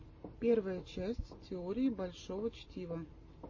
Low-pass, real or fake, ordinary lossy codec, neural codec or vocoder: 7.2 kHz; real; MP3, 32 kbps; none